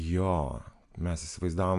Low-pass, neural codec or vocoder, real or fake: 10.8 kHz; none; real